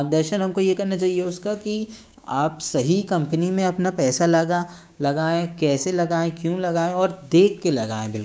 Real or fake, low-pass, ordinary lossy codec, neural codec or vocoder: fake; none; none; codec, 16 kHz, 6 kbps, DAC